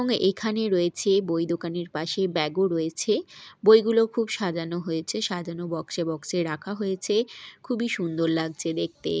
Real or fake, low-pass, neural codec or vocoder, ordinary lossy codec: real; none; none; none